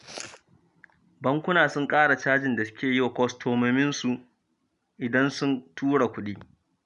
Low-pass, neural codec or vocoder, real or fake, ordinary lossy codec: 10.8 kHz; none; real; none